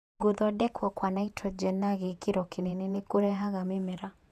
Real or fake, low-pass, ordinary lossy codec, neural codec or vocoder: fake; 14.4 kHz; none; vocoder, 48 kHz, 128 mel bands, Vocos